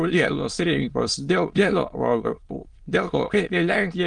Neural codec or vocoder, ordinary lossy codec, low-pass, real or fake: autoencoder, 22.05 kHz, a latent of 192 numbers a frame, VITS, trained on many speakers; Opus, 24 kbps; 9.9 kHz; fake